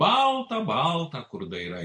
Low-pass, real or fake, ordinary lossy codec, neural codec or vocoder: 9.9 kHz; real; MP3, 48 kbps; none